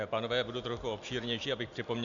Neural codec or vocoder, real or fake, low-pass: none; real; 7.2 kHz